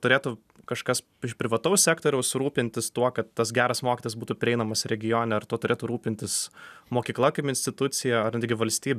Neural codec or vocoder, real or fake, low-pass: none; real; 14.4 kHz